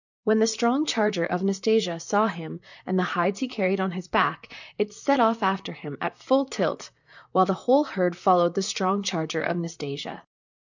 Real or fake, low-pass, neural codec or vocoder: fake; 7.2 kHz; vocoder, 44.1 kHz, 128 mel bands, Pupu-Vocoder